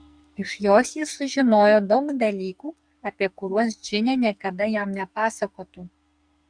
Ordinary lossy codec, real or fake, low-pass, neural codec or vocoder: Opus, 64 kbps; fake; 9.9 kHz; codec, 44.1 kHz, 2.6 kbps, SNAC